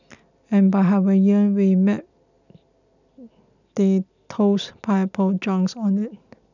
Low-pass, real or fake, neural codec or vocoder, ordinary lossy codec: 7.2 kHz; real; none; none